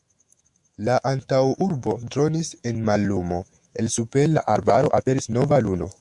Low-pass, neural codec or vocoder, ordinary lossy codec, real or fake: 10.8 kHz; autoencoder, 48 kHz, 128 numbers a frame, DAC-VAE, trained on Japanese speech; Opus, 64 kbps; fake